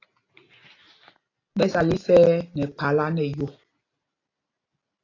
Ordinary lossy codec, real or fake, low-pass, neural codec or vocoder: AAC, 32 kbps; real; 7.2 kHz; none